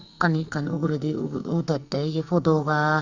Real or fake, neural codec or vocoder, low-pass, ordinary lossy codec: fake; codec, 44.1 kHz, 2.6 kbps, SNAC; 7.2 kHz; Opus, 64 kbps